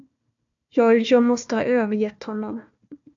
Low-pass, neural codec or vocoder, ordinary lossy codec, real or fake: 7.2 kHz; codec, 16 kHz, 1 kbps, FunCodec, trained on Chinese and English, 50 frames a second; AAC, 64 kbps; fake